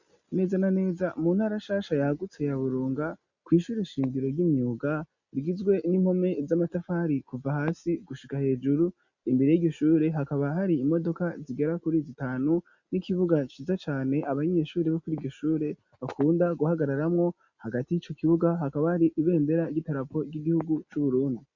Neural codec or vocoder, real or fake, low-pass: none; real; 7.2 kHz